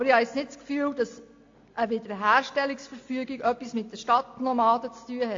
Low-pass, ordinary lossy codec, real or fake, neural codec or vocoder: 7.2 kHz; AAC, 48 kbps; real; none